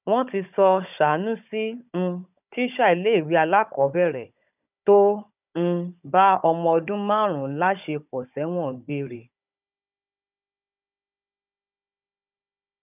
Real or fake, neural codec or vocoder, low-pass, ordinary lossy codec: fake; codec, 16 kHz, 16 kbps, FunCodec, trained on Chinese and English, 50 frames a second; 3.6 kHz; none